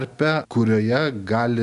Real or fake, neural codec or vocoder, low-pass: real; none; 10.8 kHz